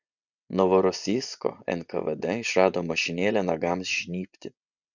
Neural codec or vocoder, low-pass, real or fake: vocoder, 24 kHz, 100 mel bands, Vocos; 7.2 kHz; fake